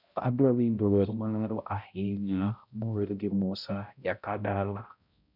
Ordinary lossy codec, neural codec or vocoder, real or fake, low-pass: none; codec, 16 kHz, 0.5 kbps, X-Codec, HuBERT features, trained on balanced general audio; fake; 5.4 kHz